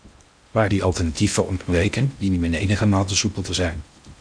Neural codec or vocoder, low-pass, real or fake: codec, 16 kHz in and 24 kHz out, 0.6 kbps, FocalCodec, streaming, 2048 codes; 9.9 kHz; fake